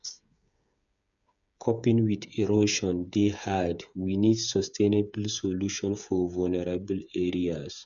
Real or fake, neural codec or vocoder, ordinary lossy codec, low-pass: fake; codec, 16 kHz, 8 kbps, FreqCodec, smaller model; none; 7.2 kHz